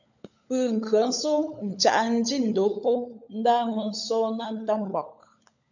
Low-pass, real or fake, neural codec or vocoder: 7.2 kHz; fake; codec, 16 kHz, 16 kbps, FunCodec, trained on LibriTTS, 50 frames a second